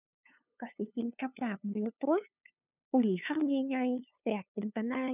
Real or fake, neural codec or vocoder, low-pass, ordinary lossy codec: fake; codec, 16 kHz, 2 kbps, FunCodec, trained on LibriTTS, 25 frames a second; 3.6 kHz; none